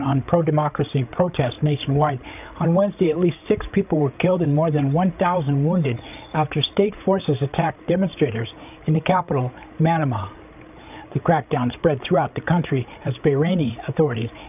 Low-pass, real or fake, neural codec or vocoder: 3.6 kHz; fake; codec, 16 kHz, 8 kbps, FreqCodec, larger model